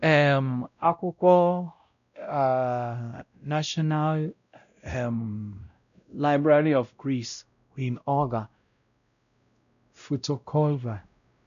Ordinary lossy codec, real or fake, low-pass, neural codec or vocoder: none; fake; 7.2 kHz; codec, 16 kHz, 0.5 kbps, X-Codec, WavLM features, trained on Multilingual LibriSpeech